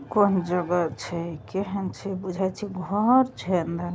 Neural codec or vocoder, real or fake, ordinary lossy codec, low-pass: none; real; none; none